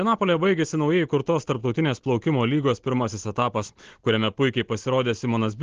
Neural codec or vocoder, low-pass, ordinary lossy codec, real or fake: none; 7.2 kHz; Opus, 16 kbps; real